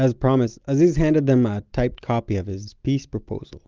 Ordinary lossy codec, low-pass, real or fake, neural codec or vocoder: Opus, 32 kbps; 7.2 kHz; real; none